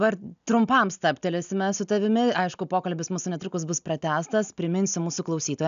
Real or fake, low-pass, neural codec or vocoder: real; 7.2 kHz; none